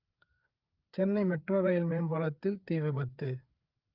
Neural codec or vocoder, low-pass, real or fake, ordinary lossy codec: codec, 16 kHz, 4 kbps, FreqCodec, larger model; 5.4 kHz; fake; Opus, 24 kbps